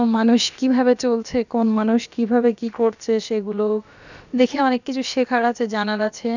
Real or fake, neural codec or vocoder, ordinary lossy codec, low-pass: fake; codec, 16 kHz, about 1 kbps, DyCAST, with the encoder's durations; none; 7.2 kHz